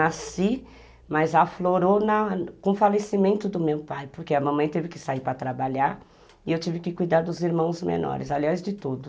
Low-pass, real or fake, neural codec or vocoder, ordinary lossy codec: none; real; none; none